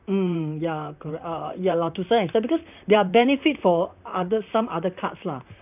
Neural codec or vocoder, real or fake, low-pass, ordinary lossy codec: vocoder, 44.1 kHz, 128 mel bands, Pupu-Vocoder; fake; 3.6 kHz; none